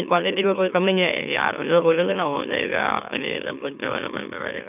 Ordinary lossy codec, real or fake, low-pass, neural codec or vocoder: none; fake; 3.6 kHz; autoencoder, 44.1 kHz, a latent of 192 numbers a frame, MeloTTS